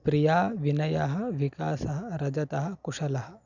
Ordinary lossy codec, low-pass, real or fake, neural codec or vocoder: none; 7.2 kHz; real; none